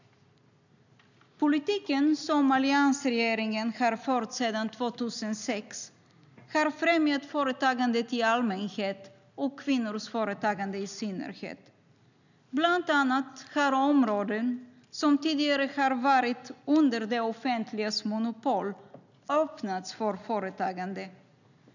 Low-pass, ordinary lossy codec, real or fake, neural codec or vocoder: 7.2 kHz; none; real; none